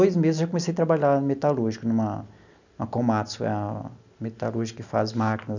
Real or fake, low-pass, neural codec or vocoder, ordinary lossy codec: real; 7.2 kHz; none; none